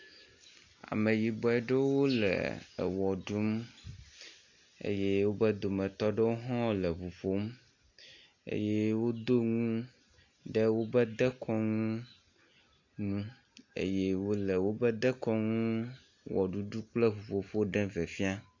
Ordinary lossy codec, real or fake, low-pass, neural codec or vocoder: AAC, 48 kbps; real; 7.2 kHz; none